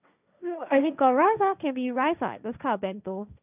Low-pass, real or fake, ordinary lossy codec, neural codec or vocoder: 3.6 kHz; fake; none; codec, 16 kHz, 1.1 kbps, Voila-Tokenizer